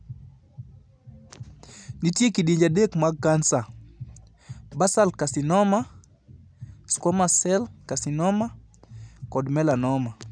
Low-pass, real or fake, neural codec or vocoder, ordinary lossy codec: 9.9 kHz; real; none; none